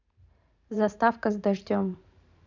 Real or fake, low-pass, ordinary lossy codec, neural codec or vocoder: fake; 7.2 kHz; none; vocoder, 44.1 kHz, 128 mel bands every 512 samples, BigVGAN v2